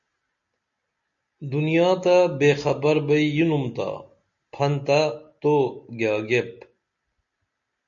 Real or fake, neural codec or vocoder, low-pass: real; none; 7.2 kHz